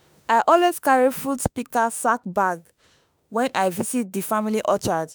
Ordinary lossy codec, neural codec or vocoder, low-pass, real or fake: none; autoencoder, 48 kHz, 32 numbers a frame, DAC-VAE, trained on Japanese speech; none; fake